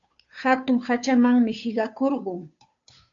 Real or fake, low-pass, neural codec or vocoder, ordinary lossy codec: fake; 7.2 kHz; codec, 16 kHz, 2 kbps, FunCodec, trained on Chinese and English, 25 frames a second; AAC, 64 kbps